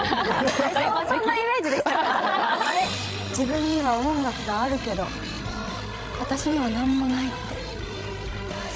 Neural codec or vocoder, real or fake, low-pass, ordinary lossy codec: codec, 16 kHz, 16 kbps, FreqCodec, larger model; fake; none; none